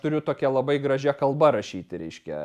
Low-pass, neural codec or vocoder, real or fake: 14.4 kHz; none; real